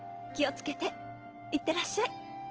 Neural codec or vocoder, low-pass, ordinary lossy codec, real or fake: none; 7.2 kHz; Opus, 16 kbps; real